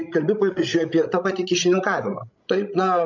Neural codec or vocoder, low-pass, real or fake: codec, 16 kHz, 16 kbps, FreqCodec, larger model; 7.2 kHz; fake